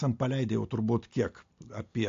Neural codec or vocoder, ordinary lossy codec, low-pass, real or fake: none; AAC, 48 kbps; 7.2 kHz; real